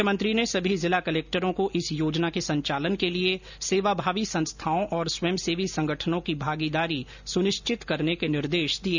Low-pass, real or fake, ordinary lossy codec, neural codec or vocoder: 7.2 kHz; real; none; none